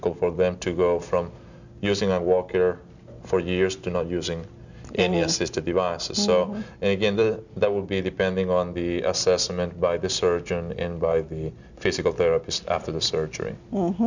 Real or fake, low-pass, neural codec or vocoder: real; 7.2 kHz; none